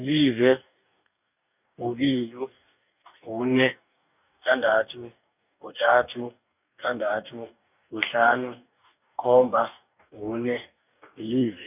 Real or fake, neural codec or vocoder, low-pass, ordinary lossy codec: fake; codec, 44.1 kHz, 2.6 kbps, DAC; 3.6 kHz; none